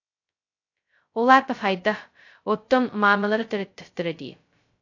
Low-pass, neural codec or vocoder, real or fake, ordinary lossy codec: 7.2 kHz; codec, 16 kHz, 0.2 kbps, FocalCodec; fake; AAC, 48 kbps